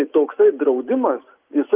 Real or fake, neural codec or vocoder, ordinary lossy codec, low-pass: real; none; Opus, 24 kbps; 3.6 kHz